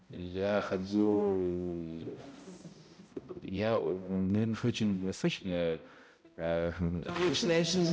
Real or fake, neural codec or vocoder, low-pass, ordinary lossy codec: fake; codec, 16 kHz, 0.5 kbps, X-Codec, HuBERT features, trained on balanced general audio; none; none